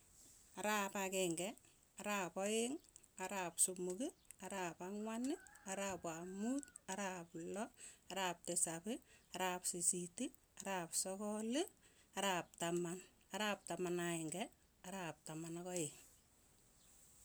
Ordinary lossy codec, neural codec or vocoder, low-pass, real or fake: none; none; none; real